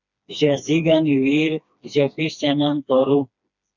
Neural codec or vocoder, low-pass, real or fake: codec, 16 kHz, 2 kbps, FreqCodec, smaller model; 7.2 kHz; fake